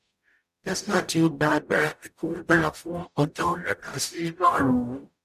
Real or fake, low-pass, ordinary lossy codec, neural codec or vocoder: fake; 14.4 kHz; none; codec, 44.1 kHz, 0.9 kbps, DAC